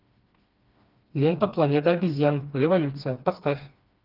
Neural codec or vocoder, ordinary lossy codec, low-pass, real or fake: codec, 16 kHz, 2 kbps, FreqCodec, smaller model; Opus, 32 kbps; 5.4 kHz; fake